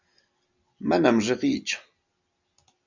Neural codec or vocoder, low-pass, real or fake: none; 7.2 kHz; real